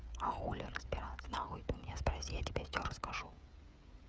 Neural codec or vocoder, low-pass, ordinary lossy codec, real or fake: codec, 16 kHz, 16 kbps, FreqCodec, smaller model; none; none; fake